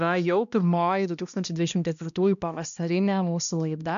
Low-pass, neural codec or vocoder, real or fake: 7.2 kHz; codec, 16 kHz, 1 kbps, X-Codec, HuBERT features, trained on balanced general audio; fake